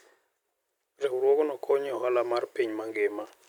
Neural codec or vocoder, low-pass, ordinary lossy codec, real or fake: none; 19.8 kHz; none; real